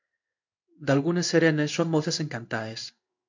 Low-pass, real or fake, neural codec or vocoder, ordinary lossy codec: 7.2 kHz; fake; codec, 16 kHz in and 24 kHz out, 1 kbps, XY-Tokenizer; MP3, 64 kbps